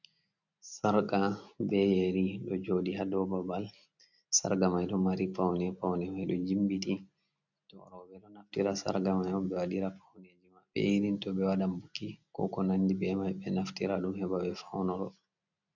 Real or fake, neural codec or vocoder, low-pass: real; none; 7.2 kHz